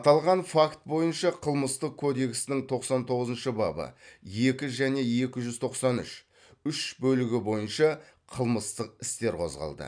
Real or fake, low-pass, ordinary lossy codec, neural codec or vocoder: real; 9.9 kHz; none; none